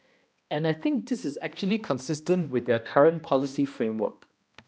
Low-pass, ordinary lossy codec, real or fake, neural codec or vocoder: none; none; fake; codec, 16 kHz, 1 kbps, X-Codec, HuBERT features, trained on balanced general audio